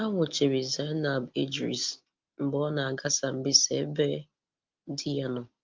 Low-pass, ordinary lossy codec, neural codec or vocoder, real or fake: 7.2 kHz; Opus, 24 kbps; none; real